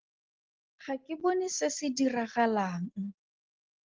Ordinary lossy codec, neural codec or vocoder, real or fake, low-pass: Opus, 16 kbps; none; real; 7.2 kHz